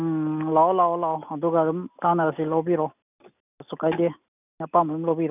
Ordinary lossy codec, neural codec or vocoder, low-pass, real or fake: none; none; 3.6 kHz; real